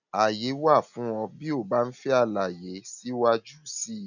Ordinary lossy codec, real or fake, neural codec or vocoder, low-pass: none; real; none; 7.2 kHz